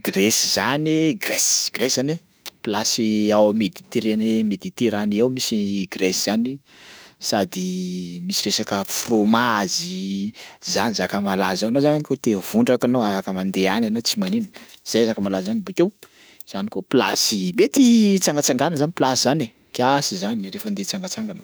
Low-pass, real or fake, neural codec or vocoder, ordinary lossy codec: none; fake; autoencoder, 48 kHz, 32 numbers a frame, DAC-VAE, trained on Japanese speech; none